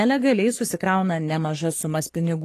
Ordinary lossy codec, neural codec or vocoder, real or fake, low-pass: AAC, 48 kbps; codec, 44.1 kHz, 3.4 kbps, Pupu-Codec; fake; 14.4 kHz